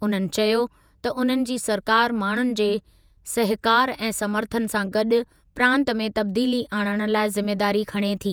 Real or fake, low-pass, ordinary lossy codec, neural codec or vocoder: fake; none; none; vocoder, 48 kHz, 128 mel bands, Vocos